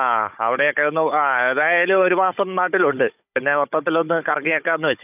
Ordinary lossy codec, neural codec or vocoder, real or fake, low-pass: none; codec, 16 kHz, 16 kbps, FunCodec, trained on Chinese and English, 50 frames a second; fake; 3.6 kHz